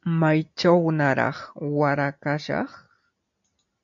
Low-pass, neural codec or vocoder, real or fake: 7.2 kHz; none; real